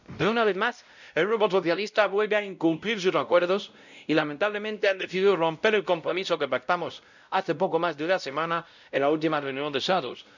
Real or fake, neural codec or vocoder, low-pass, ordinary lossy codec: fake; codec, 16 kHz, 0.5 kbps, X-Codec, WavLM features, trained on Multilingual LibriSpeech; 7.2 kHz; none